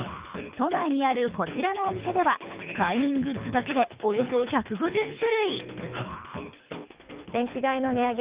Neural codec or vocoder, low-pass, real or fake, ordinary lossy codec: codec, 24 kHz, 3 kbps, HILCodec; 3.6 kHz; fake; Opus, 24 kbps